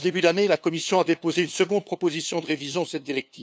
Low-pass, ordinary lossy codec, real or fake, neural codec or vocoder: none; none; fake; codec, 16 kHz, 2 kbps, FunCodec, trained on LibriTTS, 25 frames a second